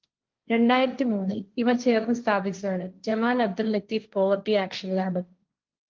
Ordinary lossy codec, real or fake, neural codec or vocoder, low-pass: Opus, 24 kbps; fake; codec, 16 kHz, 1.1 kbps, Voila-Tokenizer; 7.2 kHz